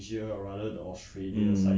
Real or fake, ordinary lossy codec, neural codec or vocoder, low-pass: real; none; none; none